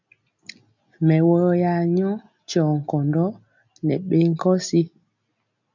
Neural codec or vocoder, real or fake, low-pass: none; real; 7.2 kHz